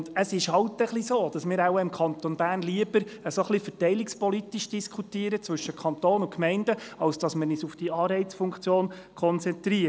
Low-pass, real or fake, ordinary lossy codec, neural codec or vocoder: none; real; none; none